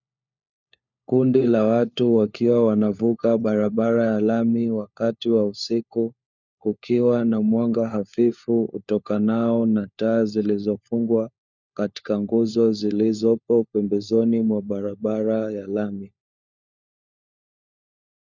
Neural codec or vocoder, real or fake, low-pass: codec, 16 kHz, 4 kbps, FunCodec, trained on LibriTTS, 50 frames a second; fake; 7.2 kHz